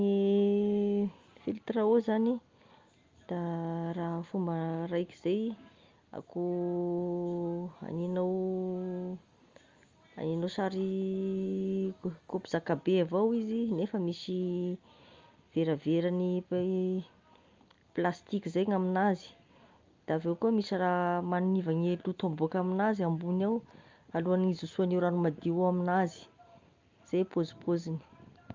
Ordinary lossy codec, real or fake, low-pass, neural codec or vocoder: Opus, 32 kbps; real; 7.2 kHz; none